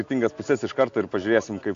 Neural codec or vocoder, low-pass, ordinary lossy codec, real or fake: none; 7.2 kHz; AAC, 48 kbps; real